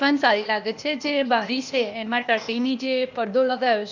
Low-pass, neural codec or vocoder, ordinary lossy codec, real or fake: 7.2 kHz; codec, 16 kHz, 0.8 kbps, ZipCodec; Opus, 64 kbps; fake